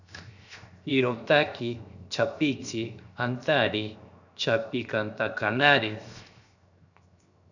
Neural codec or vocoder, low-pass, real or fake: codec, 16 kHz, 0.7 kbps, FocalCodec; 7.2 kHz; fake